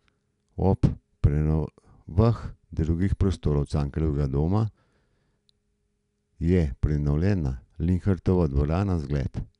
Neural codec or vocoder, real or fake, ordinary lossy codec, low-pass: none; real; none; 10.8 kHz